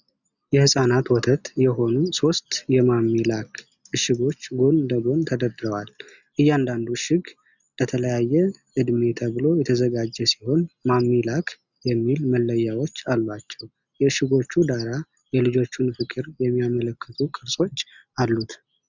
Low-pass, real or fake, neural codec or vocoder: 7.2 kHz; real; none